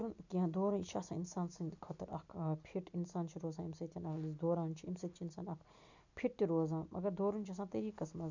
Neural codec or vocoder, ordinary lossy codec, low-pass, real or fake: none; none; 7.2 kHz; real